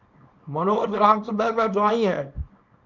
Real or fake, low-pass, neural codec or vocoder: fake; 7.2 kHz; codec, 24 kHz, 0.9 kbps, WavTokenizer, small release